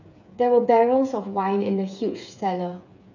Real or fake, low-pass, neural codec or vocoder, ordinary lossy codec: fake; 7.2 kHz; codec, 16 kHz, 8 kbps, FreqCodec, smaller model; none